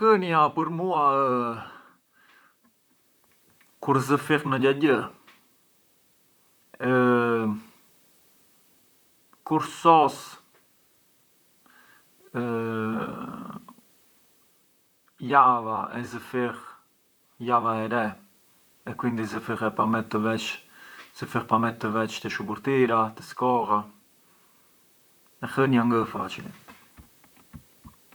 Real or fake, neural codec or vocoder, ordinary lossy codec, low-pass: fake; vocoder, 44.1 kHz, 128 mel bands, Pupu-Vocoder; none; none